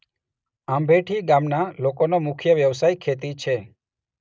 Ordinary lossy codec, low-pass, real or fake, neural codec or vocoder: none; none; real; none